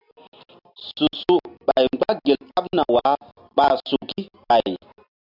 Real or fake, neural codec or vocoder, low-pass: real; none; 5.4 kHz